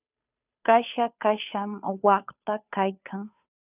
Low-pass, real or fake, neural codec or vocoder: 3.6 kHz; fake; codec, 16 kHz, 2 kbps, FunCodec, trained on Chinese and English, 25 frames a second